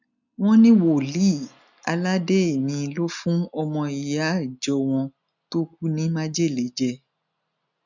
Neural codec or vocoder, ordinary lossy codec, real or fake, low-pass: none; none; real; 7.2 kHz